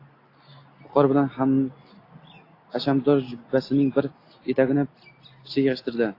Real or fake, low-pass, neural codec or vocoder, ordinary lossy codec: real; 5.4 kHz; none; AAC, 32 kbps